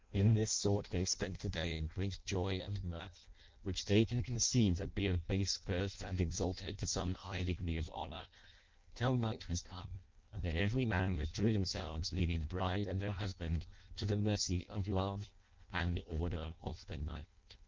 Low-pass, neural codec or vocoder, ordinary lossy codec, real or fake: 7.2 kHz; codec, 16 kHz in and 24 kHz out, 0.6 kbps, FireRedTTS-2 codec; Opus, 24 kbps; fake